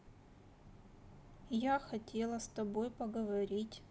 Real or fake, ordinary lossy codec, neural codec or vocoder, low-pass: real; none; none; none